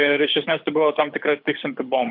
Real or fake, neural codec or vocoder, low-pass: fake; codec, 24 kHz, 6 kbps, HILCodec; 5.4 kHz